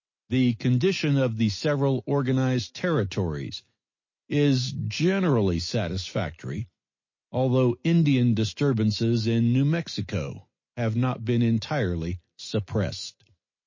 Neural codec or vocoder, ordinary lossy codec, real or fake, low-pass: none; MP3, 32 kbps; real; 7.2 kHz